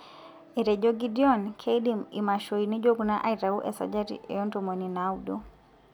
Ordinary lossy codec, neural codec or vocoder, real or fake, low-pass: none; none; real; 19.8 kHz